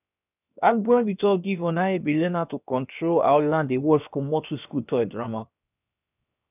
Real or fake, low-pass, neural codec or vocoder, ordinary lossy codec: fake; 3.6 kHz; codec, 16 kHz, 0.7 kbps, FocalCodec; none